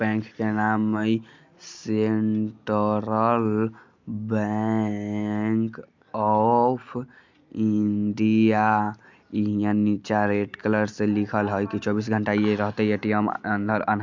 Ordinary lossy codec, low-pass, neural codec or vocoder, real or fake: MP3, 64 kbps; 7.2 kHz; none; real